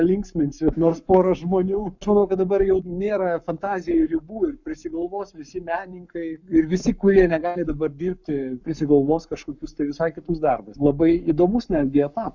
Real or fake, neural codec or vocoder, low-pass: fake; codec, 44.1 kHz, 7.8 kbps, Pupu-Codec; 7.2 kHz